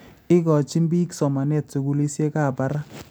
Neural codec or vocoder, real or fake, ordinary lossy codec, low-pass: none; real; none; none